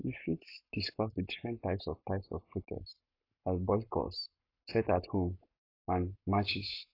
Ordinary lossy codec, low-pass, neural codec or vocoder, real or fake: AAC, 32 kbps; 5.4 kHz; codec, 44.1 kHz, 7.8 kbps, Pupu-Codec; fake